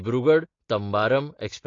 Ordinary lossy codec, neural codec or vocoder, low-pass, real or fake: AAC, 32 kbps; none; 7.2 kHz; real